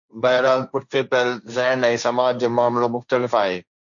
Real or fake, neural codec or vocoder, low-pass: fake; codec, 16 kHz, 1.1 kbps, Voila-Tokenizer; 7.2 kHz